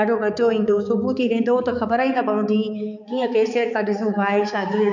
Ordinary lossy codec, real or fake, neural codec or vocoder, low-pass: none; fake; codec, 16 kHz, 4 kbps, X-Codec, HuBERT features, trained on balanced general audio; 7.2 kHz